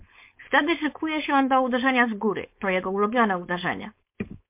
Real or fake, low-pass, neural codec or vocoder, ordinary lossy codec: fake; 3.6 kHz; codec, 16 kHz, 4.8 kbps, FACodec; MP3, 32 kbps